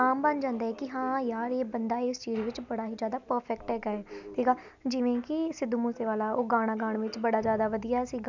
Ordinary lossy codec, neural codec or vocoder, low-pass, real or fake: none; none; 7.2 kHz; real